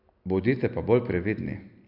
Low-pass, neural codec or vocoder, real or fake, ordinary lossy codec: 5.4 kHz; none; real; none